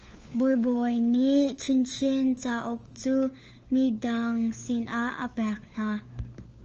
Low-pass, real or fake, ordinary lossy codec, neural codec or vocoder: 7.2 kHz; fake; Opus, 24 kbps; codec, 16 kHz, 4 kbps, FunCodec, trained on LibriTTS, 50 frames a second